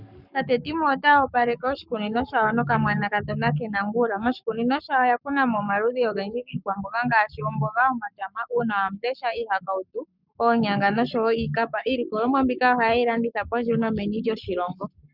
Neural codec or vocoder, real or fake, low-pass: codec, 44.1 kHz, 7.8 kbps, Pupu-Codec; fake; 5.4 kHz